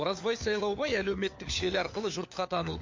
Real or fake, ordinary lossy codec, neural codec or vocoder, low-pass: fake; MP3, 48 kbps; codec, 16 kHz, 4 kbps, FunCodec, trained on LibriTTS, 50 frames a second; 7.2 kHz